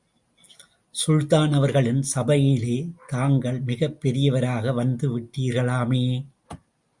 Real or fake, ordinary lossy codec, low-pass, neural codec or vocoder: real; Opus, 64 kbps; 10.8 kHz; none